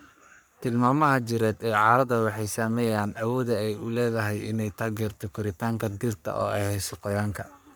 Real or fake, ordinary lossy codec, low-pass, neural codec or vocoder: fake; none; none; codec, 44.1 kHz, 3.4 kbps, Pupu-Codec